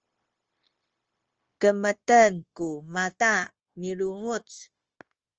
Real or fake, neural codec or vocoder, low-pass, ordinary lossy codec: fake; codec, 16 kHz, 0.9 kbps, LongCat-Audio-Codec; 7.2 kHz; Opus, 24 kbps